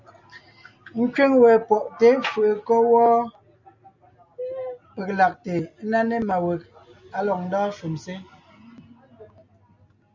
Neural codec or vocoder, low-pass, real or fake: none; 7.2 kHz; real